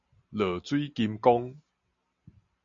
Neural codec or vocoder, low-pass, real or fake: none; 7.2 kHz; real